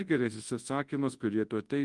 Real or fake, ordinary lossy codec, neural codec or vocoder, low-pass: fake; Opus, 24 kbps; codec, 24 kHz, 0.9 kbps, WavTokenizer, large speech release; 10.8 kHz